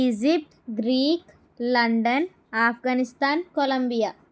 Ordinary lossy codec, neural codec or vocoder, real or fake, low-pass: none; none; real; none